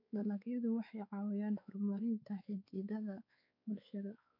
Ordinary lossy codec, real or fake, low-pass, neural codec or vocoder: MP3, 48 kbps; fake; 5.4 kHz; codec, 16 kHz, 4 kbps, X-Codec, WavLM features, trained on Multilingual LibriSpeech